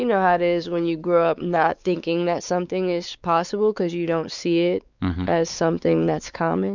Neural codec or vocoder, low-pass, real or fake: codec, 16 kHz, 4 kbps, X-Codec, WavLM features, trained on Multilingual LibriSpeech; 7.2 kHz; fake